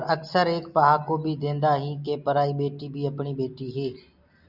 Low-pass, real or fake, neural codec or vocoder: 5.4 kHz; real; none